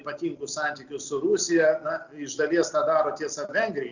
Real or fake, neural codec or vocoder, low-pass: real; none; 7.2 kHz